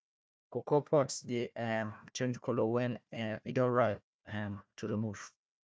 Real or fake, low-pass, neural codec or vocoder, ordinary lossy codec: fake; none; codec, 16 kHz, 1 kbps, FunCodec, trained on Chinese and English, 50 frames a second; none